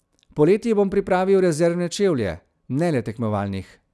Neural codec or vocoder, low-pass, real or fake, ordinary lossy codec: none; none; real; none